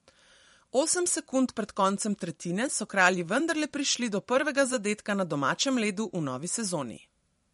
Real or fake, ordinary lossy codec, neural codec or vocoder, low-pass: fake; MP3, 48 kbps; vocoder, 44.1 kHz, 128 mel bands every 512 samples, BigVGAN v2; 19.8 kHz